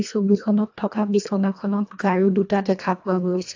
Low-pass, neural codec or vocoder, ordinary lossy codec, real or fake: 7.2 kHz; codec, 24 kHz, 1.5 kbps, HILCodec; AAC, 48 kbps; fake